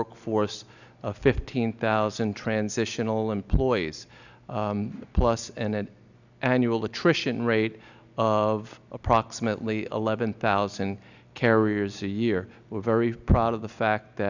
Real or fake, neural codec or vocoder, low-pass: real; none; 7.2 kHz